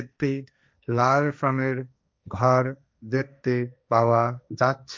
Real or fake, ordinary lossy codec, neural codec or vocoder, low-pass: fake; none; codec, 16 kHz, 1.1 kbps, Voila-Tokenizer; none